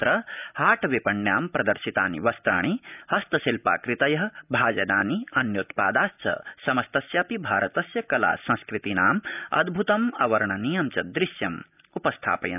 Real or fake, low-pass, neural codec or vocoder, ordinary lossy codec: real; 3.6 kHz; none; none